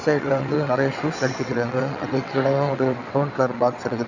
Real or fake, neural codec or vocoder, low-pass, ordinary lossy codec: fake; vocoder, 22.05 kHz, 80 mel bands, WaveNeXt; 7.2 kHz; none